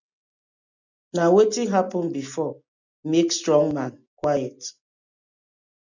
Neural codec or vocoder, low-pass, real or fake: none; 7.2 kHz; real